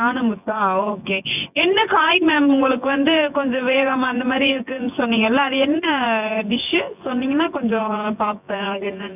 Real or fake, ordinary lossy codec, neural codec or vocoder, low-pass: fake; none; vocoder, 24 kHz, 100 mel bands, Vocos; 3.6 kHz